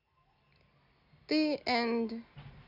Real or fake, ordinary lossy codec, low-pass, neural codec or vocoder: real; none; 5.4 kHz; none